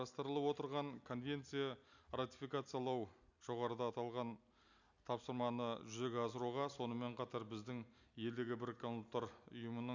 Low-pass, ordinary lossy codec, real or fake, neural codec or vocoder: 7.2 kHz; none; real; none